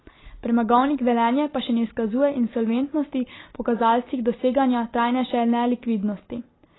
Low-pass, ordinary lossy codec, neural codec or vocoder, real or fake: 7.2 kHz; AAC, 16 kbps; none; real